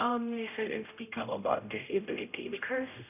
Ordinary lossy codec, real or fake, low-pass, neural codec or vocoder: none; fake; 3.6 kHz; codec, 16 kHz, 0.5 kbps, X-Codec, HuBERT features, trained on general audio